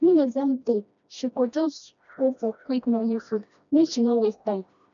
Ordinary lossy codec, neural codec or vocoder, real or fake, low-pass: none; codec, 16 kHz, 1 kbps, FreqCodec, smaller model; fake; 7.2 kHz